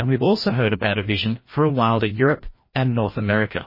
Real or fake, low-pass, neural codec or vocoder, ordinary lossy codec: fake; 5.4 kHz; codec, 16 kHz, 1 kbps, FreqCodec, larger model; MP3, 24 kbps